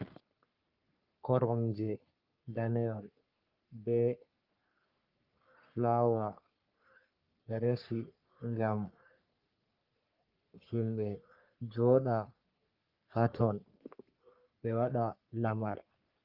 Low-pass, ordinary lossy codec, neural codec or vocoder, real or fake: 5.4 kHz; Opus, 24 kbps; codec, 44.1 kHz, 3.4 kbps, Pupu-Codec; fake